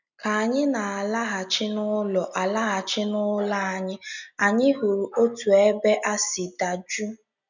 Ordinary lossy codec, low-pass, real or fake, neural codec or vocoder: none; 7.2 kHz; real; none